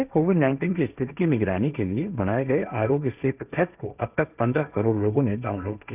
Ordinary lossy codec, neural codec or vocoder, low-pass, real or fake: none; codec, 16 kHz, 1.1 kbps, Voila-Tokenizer; 3.6 kHz; fake